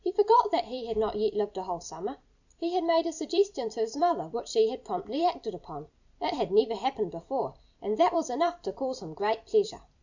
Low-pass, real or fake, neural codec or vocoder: 7.2 kHz; fake; vocoder, 44.1 kHz, 128 mel bands every 256 samples, BigVGAN v2